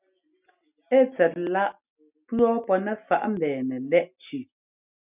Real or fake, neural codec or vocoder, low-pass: real; none; 3.6 kHz